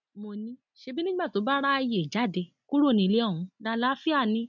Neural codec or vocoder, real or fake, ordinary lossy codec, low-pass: none; real; none; 7.2 kHz